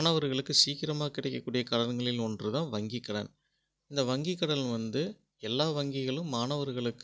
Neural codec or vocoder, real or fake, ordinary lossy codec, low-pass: none; real; none; none